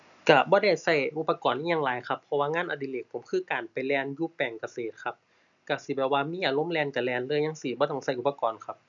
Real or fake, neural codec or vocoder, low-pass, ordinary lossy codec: real; none; 7.2 kHz; none